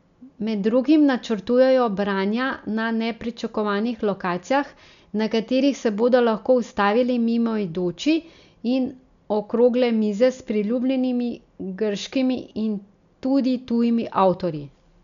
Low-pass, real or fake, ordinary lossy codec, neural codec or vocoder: 7.2 kHz; real; none; none